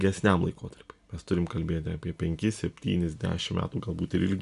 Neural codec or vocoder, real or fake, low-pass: none; real; 10.8 kHz